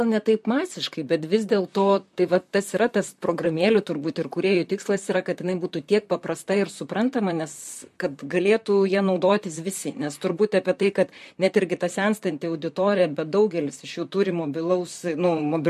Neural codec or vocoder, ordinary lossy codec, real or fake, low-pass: vocoder, 44.1 kHz, 128 mel bands, Pupu-Vocoder; MP3, 64 kbps; fake; 14.4 kHz